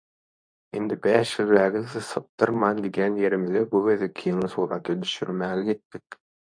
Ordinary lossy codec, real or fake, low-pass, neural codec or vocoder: AAC, 64 kbps; fake; 9.9 kHz; codec, 24 kHz, 0.9 kbps, WavTokenizer, medium speech release version 2